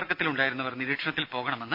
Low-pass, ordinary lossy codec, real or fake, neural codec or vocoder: 5.4 kHz; none; real; none